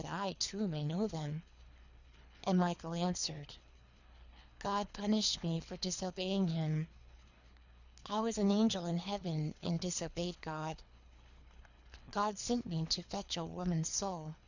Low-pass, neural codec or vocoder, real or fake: 7.2 kHz; codec, 24 kHz, 3 kbps, HILCodec; fake